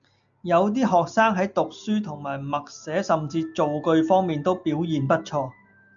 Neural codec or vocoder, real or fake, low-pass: none; real; 7.2 kHz